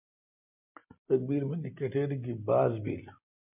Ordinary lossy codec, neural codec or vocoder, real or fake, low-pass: MP3, 32 kbps; none; real; 3.6 kHz